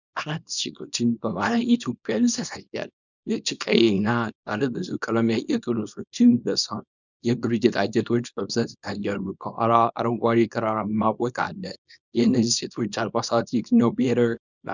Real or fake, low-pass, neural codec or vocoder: fake; 7.2 kHz; codec, 24 kHz, 0.9 kbps, WavTokenizer, small release